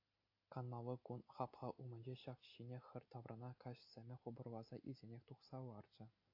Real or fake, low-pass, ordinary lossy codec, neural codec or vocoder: real; 5.4 kHz; AAC, 32 kbps; none